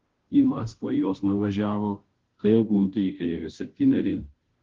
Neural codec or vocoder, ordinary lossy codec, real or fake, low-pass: codec, 16 kHz, 0.5 kbps, FunCodec, trained on Chinese and English, 25 frames a second; Opus, 16 kbps; fake; 7.2 kHz